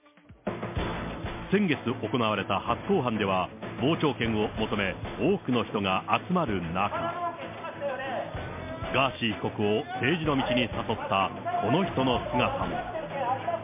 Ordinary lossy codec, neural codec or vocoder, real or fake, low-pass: MP3, 24 kbps; none; real; 3.6 kHz